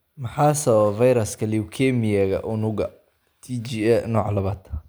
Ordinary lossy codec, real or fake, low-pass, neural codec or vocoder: none; real; none; none